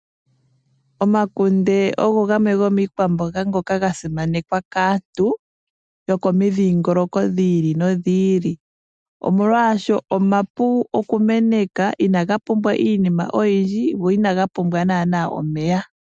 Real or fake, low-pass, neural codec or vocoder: real; 9.9 kHz; none